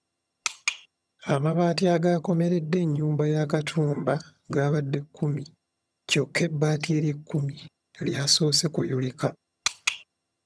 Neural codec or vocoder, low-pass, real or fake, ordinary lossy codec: vocoder, 22.05 kHz, 80 mel bands, HiFi-GAN; none; fake; none